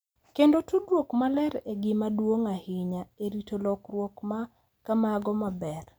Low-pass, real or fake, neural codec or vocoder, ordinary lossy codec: none; real; none; none